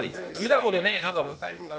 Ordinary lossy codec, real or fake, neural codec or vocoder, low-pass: none; fake; codec, 16 kHz, 0.8 kbps, ZipCodec; none